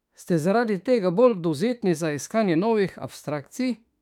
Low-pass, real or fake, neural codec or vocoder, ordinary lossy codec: 19.8 kHz; fake; autoencoder, 48 kHz, 32 numbers a frame, DAC-VAE, trained on Japanese speech; none